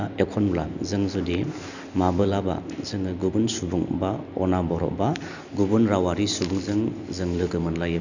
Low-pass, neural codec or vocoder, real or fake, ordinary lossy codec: 7.2 kHz; none; real; none